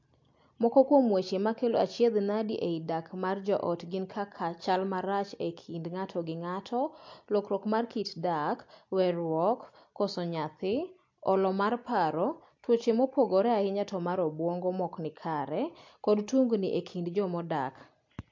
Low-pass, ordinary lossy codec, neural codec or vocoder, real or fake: 7.2 kHz; MP3, 48 kbps; none; real